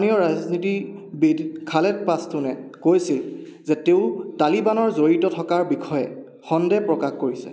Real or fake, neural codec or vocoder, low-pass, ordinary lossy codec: real; none; none; none